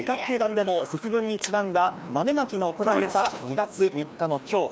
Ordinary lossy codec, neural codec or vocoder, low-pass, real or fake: none; codec, 16 kHz, 1 kbps, FreqCodec, larger model; none; fake